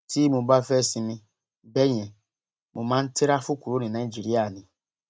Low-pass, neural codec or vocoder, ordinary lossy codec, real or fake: none; none; none; real